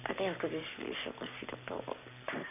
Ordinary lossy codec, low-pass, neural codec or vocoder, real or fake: none; 3.6 kHz; codec, 44.1 kHz, 7.8 kbps, Pupu-Codec; fake